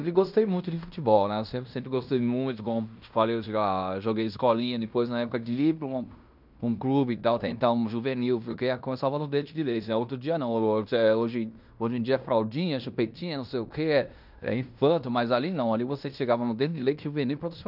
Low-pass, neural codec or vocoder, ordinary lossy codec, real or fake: 5.4 kHz; codec, 16 kHz in and 24 kHz out, 0.9 kbps, LongCat-Audio-Codec, fine tuned four codebook decoder; none; fake